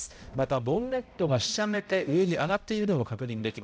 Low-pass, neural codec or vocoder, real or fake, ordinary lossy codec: none; codec, 16 kHz, 0.5 kbps, X-Codec, HuBERT features, trained on balanced general audio; fake; none